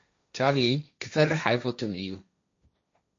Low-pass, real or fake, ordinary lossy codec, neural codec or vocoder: 7.2 kHz; fake; MP3, 48 kbps; codec, 16 kHz, 1.1 kbps, Voila-Tokenizer